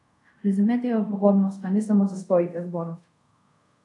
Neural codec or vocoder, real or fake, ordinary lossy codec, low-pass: codec, 24 kHz, 0.5 kbps, DualCodec; fake; AAC, 64 kbps; 10.8 kHz